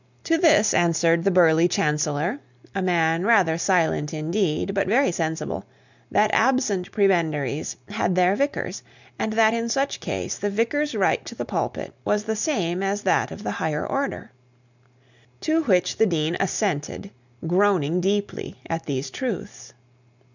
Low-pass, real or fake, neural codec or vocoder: 7.2 kHz; real; none